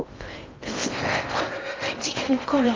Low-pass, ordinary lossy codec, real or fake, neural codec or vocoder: 7.2 kHz; Opus, 32 kbps; fake; codec, 16 kHz in and 24 kHz out, 0.6 kbps, FocalCodec, streaming, 4096 codes